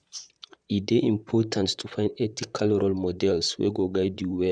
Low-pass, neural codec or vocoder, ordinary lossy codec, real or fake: 9.9 kHz; vocoder, 44.1 kHz, 128 mel bands, Pupu-Vocoder; none; fake